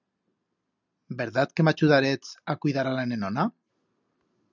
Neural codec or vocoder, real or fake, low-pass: none; real; 7.2 kHz